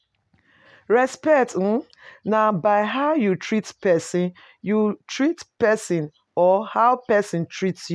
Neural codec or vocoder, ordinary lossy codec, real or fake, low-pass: none; none; real; none